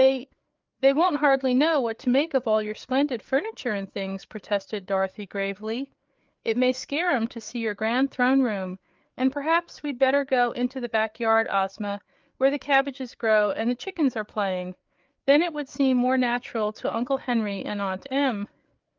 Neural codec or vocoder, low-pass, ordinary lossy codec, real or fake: codec, 16 kHz, 4 kbps, FreqCodec, larger model; 7.2 kHz; Opus, 32 kbps; fake